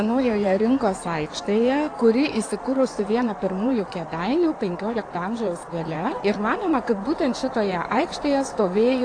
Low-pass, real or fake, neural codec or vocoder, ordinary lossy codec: 9.9 kHz; fake; codec, 16 kHz in and 24 kHz out, 2.2 kbps, FireRedTTS-2 codec; AAC, 48 kbps